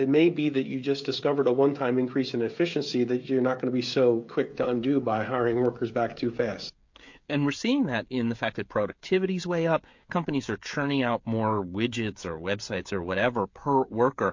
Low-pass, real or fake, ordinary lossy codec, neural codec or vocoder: 7.2 kHz; fake; MP3, 48 kbps; codec, 16 kHz, 16 kbps, FreqCodec, smaller model